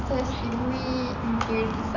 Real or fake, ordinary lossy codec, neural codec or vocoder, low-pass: real; none; none; 7.2 kHz